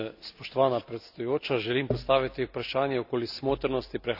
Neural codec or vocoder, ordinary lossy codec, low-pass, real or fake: none; none; 5.4 kHz; real